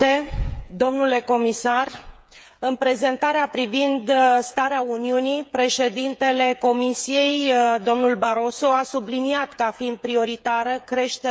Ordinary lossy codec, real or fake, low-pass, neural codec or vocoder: none; fake; none; codec, 16 kHz, 8 kbps, FreqCodec, smaller model